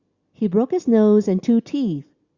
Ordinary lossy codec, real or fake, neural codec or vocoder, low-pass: Opus, 64 kbps; real; none; 7.2 kHz